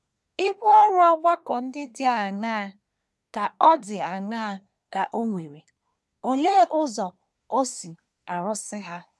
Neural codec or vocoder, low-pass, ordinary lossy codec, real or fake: codec, 24 kHz, 1 kbps, SNAC; none; none; fake